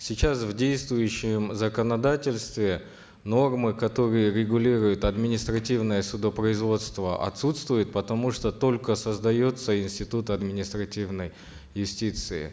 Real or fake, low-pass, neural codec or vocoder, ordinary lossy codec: real; none; none; none